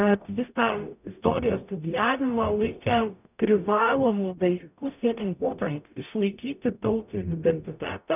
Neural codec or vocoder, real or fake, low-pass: codec, 44.1 kHz, 0.9 kbps, DAC; fake; 3.6 kHz